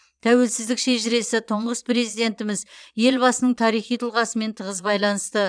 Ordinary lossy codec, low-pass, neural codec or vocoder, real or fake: none; 9.9 kHz; vocoder, 22.05 kHz, 80 mel bands, Vocos; fake